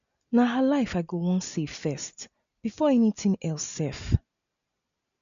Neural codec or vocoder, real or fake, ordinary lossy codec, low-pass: none; real; none; 7.2 kHz